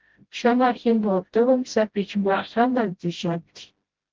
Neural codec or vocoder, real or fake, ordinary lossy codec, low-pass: codec, 16 kHz, 0.5 kbps, FreqCodec, smaller model; fake; Opus, 16 kbps; 7.2 kHz